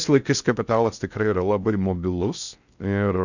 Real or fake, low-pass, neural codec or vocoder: fake; 7.2 kHz; codec, 16 kHz in and 24 kHz out, 0.6 kbps, FocalCodec, streaming, 4096 codes